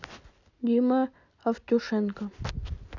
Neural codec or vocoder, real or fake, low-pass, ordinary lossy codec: none; real; 7.2 kHz; none